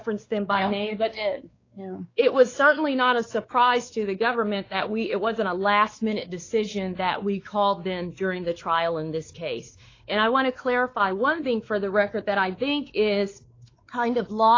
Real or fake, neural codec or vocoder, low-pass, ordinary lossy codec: fake; codec, 16 kHz, 4 kbps, X-Codec, WavLM features, trained on Multilingual LibriSpeech; 7.2 kHz; AAC, 32 kbps